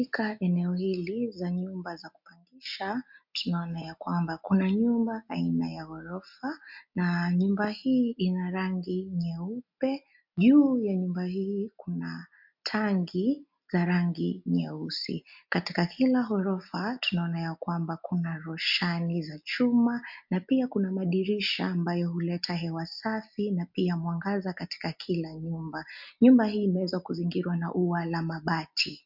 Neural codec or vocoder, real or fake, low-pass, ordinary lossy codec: none; real; 5.4 kHz; MP3, 48 kbps